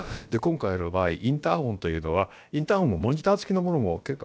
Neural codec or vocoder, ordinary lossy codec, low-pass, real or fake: codec, 16 kHz, about 1 kbps, DyCAST, with the encoder's durations; none; none; fake